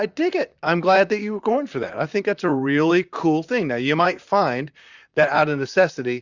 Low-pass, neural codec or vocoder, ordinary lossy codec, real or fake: 7.2 kHz; vocoder, 44.1 kHz, 128 mel bands, Pupu-Vocoder; Opus, 64 kbps; fake